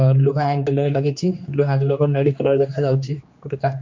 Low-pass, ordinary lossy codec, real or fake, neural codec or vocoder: 7.2 kHz; MP3, 48 kbps; fake; codec, 16 kHz, 2 kbps, X-Codec, HuBERT features, trained on general audio